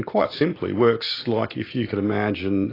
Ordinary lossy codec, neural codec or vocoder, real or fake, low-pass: AAC, 24 kbps; none; real; 5.4 kHz